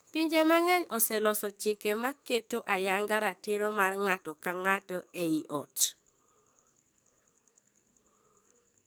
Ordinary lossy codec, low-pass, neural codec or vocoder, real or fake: none; none; codec, 44.1 kHz, 2.6 kbps, SNAC; fake